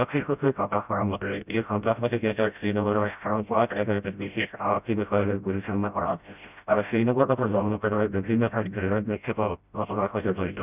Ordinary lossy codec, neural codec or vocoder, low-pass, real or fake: none; codec, 16 kHz, 0.5 kbps, FreqCodec, smaller model; 3.6 kHz; fake